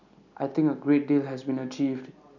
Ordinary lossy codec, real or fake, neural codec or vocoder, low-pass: none; real; none; 7.2 kHz